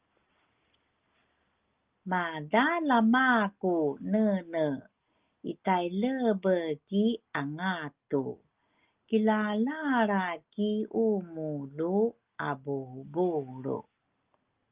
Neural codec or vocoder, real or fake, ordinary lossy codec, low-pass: none; real; Opus, 32 kbps; 3.6 kHz